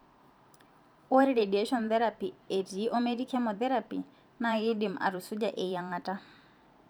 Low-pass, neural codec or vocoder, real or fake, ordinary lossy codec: none; vocoder, 44.1 kHz, 128 mel bands every 512 samples, BigVGAN v2; fake; none